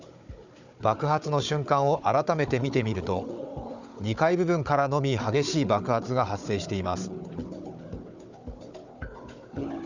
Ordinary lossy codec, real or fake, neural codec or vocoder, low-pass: none; fake; codec, 16 kHz, 4 kbps, FunCodec, trained on Chinese and English, 50 frames a second; 7.2 kHz